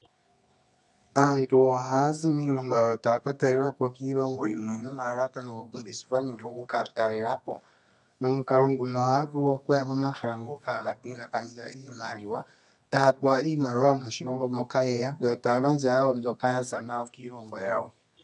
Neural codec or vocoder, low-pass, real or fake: codec, 24 kHz, 0.9 kbps, WavTokenizer, medium music audio release; 10.8 kHz; fake